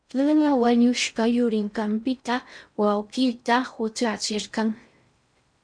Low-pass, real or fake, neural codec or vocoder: 9.9 kHz; fake; codec, 16 kHz in and 24 kHz out, 0.6 kbps, FocalCodec, streaming, 4096 codes